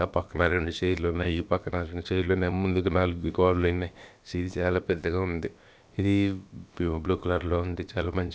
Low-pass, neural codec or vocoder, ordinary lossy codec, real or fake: none; codec, 16 kHz, about 1 kbps, DyCAST, with the encoder's durations; none; fake